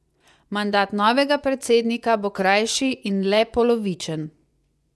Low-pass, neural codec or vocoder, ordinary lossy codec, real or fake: none; vocoder, 24 kHz, 100 mel bands, Vocos; none; fake